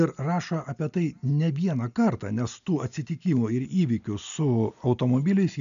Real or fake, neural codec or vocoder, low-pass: real; none; 7.2 kHz